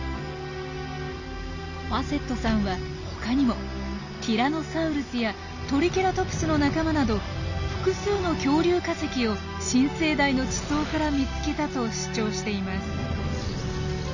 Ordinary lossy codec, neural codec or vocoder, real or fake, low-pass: none; none; real; 7.2 kHz